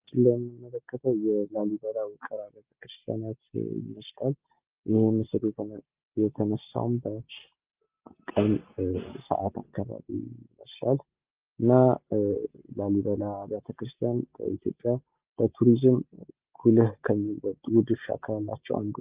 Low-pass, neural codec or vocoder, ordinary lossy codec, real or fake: 3.6 kHz; none; Opus, 32 kbps; real